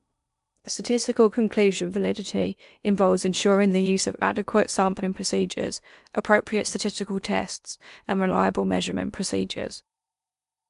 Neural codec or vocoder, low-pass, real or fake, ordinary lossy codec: codec, 16 kHz in and 24 kHz out, 0.8 kbps, FocalCodec, streaming, 65536 codes; 10.8 kHz; fake; none